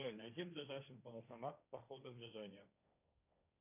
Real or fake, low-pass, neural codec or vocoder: fake; 3.6 kHz; codec, 16 kHz, 1.1 kbps, Voila-Tokenizer